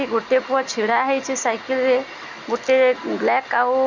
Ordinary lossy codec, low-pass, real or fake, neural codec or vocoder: none; 7.2 kHz; real; none